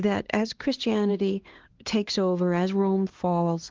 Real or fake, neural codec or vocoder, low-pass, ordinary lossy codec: fake; codec, 16 kHz, 2 kbps, X-Codec, HuBERT features, trained on LibriSpeech; 7.2 kHz; Opus, 16 kbps